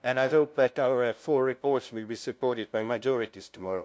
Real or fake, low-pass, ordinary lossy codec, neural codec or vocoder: fake; none; none; codec, 16 kHz, 0.5 kbps, FunCodec, trained on LibriTTS, 25 frames a second